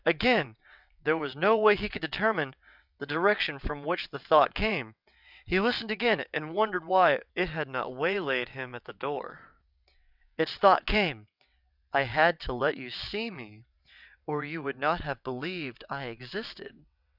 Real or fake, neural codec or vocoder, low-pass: fake; vocoder, 22.05 kHz, 80 mel bands, WaveNeXt; 5.4 kHz